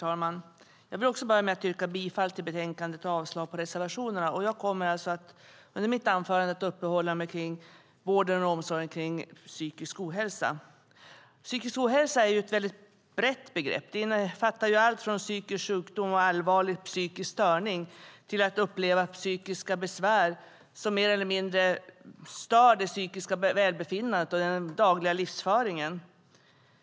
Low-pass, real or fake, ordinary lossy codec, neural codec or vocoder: none; real; none; none